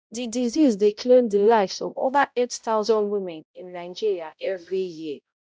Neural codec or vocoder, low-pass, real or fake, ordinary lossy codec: codec, 16 kHz, 0.5 kbps, X-Codec, HuBERT features, trained on balanced general audio; none; fake; none